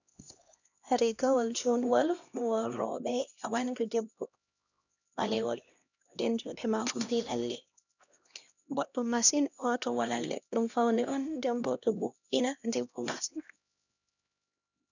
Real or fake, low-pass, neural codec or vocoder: fake; 7.2 kHz; codec, 16 kHz, 1 kbps, X-Codec, HuBERT features, trained on LibriSpeech